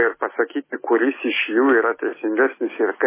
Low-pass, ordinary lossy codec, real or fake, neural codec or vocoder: 3.6 kHz; MP3, 16 kbps; real; none